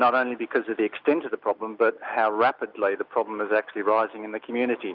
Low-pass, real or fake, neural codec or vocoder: 5.4 kHz; real; none